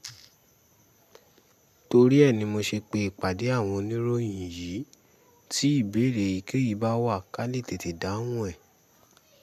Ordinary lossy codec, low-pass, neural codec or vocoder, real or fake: none; 14.4 kHz; none; real